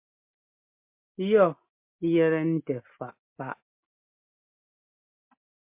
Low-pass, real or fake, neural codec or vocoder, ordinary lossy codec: 3.6 kHz; real; none; MP3, 32 kbps